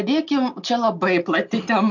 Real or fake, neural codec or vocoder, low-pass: real; none; 7.2 kHz